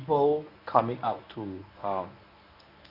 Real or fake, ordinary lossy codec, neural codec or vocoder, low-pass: fake; none; codec, 24 kHz, 0.9 kbps, WavTokenizer, medium speech release version 1; 5.4 kHz